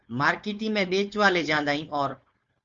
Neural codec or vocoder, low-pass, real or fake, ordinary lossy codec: codec, 16 kHz, 4.8 kbps, FACodec; 7.2 kHz; fake; Opus, 32 kbps